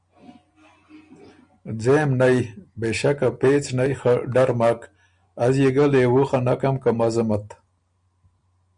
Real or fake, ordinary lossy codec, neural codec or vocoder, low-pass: real; MP3, 96 kbps; none; 9.9 kHz